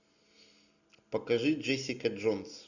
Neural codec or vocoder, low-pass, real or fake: none; 7.2 kHz; real